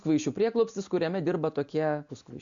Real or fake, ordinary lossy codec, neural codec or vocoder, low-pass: real; MP3, 64 kbps; none; 7.2 kHz